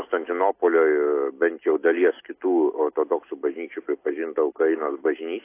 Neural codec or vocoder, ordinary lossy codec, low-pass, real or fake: none; AAC, 24 kbps; 3.6 kHz; real